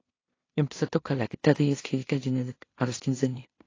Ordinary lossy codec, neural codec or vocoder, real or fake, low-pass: AAC, 32 kbps; codec, 16 kHz in and 24 kHz out, 0.4 kbps, LongCat-Audio-Codec, two codebook decoder; fake; 7.2 kHz